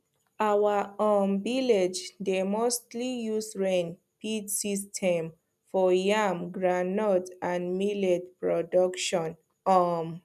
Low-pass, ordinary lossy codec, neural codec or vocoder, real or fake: 14.4 kHz; none; none; real